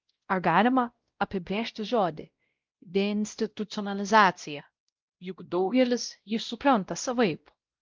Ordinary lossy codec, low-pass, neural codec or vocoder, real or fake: Opus, 32 kbps; 7.2 kHz; codec, 16 kHz, 0.5 kbps, X-Codec, WavLM features, trained on Multilingual LibriSpeech; fake